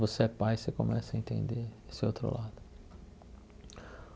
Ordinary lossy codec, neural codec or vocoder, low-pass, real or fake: none; none; none; real